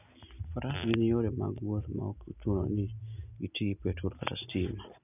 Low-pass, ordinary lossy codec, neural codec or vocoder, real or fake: 3.6 kHz; none; none; real